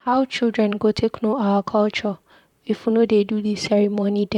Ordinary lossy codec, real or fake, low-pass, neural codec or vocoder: none; fake; 19.8 kHz; vocoder, 44.1 kHz, 128 mel bands, Pupu-Vocoder